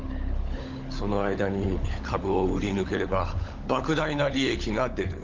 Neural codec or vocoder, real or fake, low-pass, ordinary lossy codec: codec, 16 kHz, 16 kbps, FunCodec, trained on LibriTTS, 50 frames a second; fake; 7.2 kHz; Opus, 32 kbps